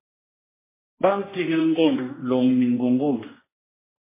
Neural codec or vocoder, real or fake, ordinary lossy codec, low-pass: codec, 16 kHz, 1 kbps, X-Codec, HuBERT features, trained on general audio; fake; MP3, 16 kbps; 3.6 kHz